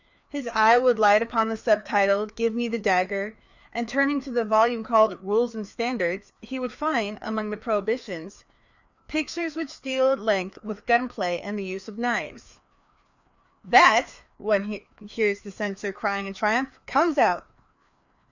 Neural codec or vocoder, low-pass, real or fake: codec, 16 kHz, 2 kbps, FreqCodec, larger model; 7.2 kHz; fake